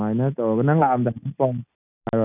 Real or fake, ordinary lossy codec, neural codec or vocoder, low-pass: real; none; none; 3.6 kHz